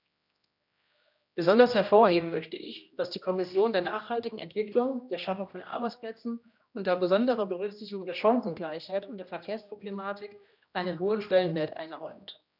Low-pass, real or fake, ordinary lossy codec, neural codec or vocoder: 5.4 kHz; fake; none; codec, 16 kHz, 1 kbps, X-Codec, HuBERT features, trained on general audio